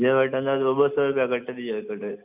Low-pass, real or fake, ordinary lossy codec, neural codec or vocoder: 3.6 kHz; real; none; none